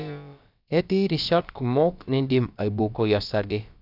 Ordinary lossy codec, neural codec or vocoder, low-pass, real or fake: none; codec, 16 kHz, about 1 kbps, DyCAST, with the encoder's durations; 5.4 kHz; fake